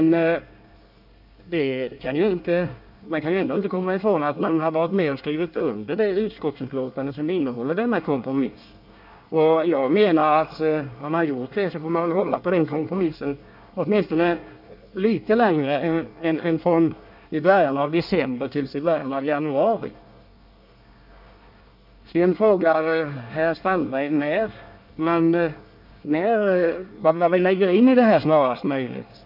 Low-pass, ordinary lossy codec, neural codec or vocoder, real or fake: 5.4 kHz; none; codec, 24 kHz, 1 kbps, SNAC; fake